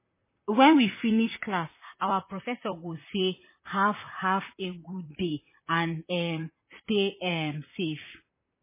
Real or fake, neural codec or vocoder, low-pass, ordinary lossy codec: fake; vocoder, 44.1 kHz, 128 mel bands, Pupu-Vocoder; 3.6 kHz; MP3, 16 kbps